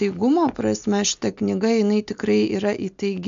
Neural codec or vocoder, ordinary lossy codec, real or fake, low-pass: none; MP3, 64 kbps; real; 7.2 kHz